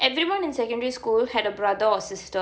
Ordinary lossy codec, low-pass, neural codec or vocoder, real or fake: none; none; none; real